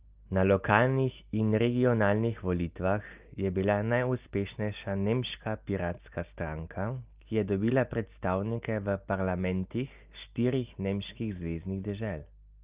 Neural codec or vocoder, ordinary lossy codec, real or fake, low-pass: none; Opus, 24 kbps; real; 3.6 kHz